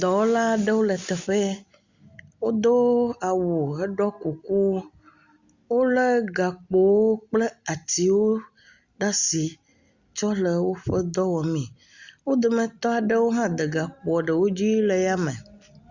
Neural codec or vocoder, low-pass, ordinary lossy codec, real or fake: none; 7.2 kHz; Opus, 64 kbps; real